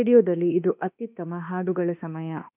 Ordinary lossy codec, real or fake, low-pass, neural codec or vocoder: none; fake; 3.6 kHz; autoencoder, 48 kHz, 32 numbers a frame, DAC-VAE, trained on Japanese speech